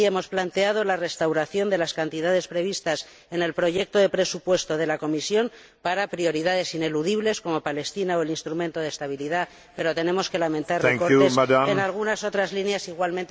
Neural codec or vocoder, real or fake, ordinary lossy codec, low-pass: none; real; none; none